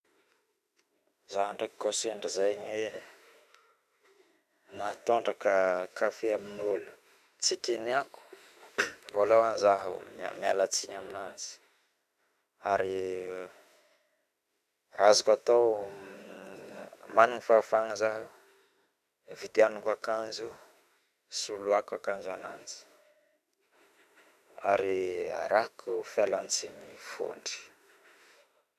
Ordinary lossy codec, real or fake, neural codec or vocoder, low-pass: none; fake; autoencoder, 48 kHz, 32 numbers a frame, DAC-VAE, trained on Japanese speech; 14.4 kHz